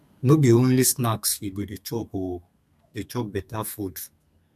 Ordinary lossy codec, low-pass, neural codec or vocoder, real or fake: none; 14.4 kHz; codec, 32 kHz, 1.9 kbps, SNAC; fake